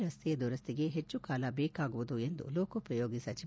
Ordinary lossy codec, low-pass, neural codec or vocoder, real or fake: none; none; none; real